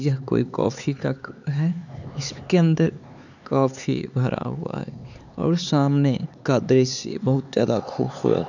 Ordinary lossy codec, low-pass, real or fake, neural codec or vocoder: none; 7.2 kHz; fake; codec, 16 kHz, 4 kbps, X-Codec, HuBERT features, trained on LibriSpeech